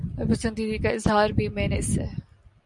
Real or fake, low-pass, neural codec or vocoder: real; 10.8 kHz; none